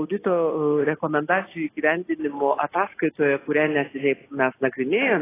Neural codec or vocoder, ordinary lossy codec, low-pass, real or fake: none; AAC, 16 kbps; 3.6 kHz; real